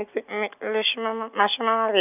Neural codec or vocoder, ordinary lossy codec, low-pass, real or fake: none; none; 3.6 kHz; real